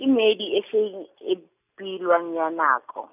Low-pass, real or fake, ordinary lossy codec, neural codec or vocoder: 3.6 kHz; real; none; none